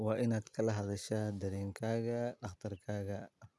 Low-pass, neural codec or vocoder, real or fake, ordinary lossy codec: none; none; real; none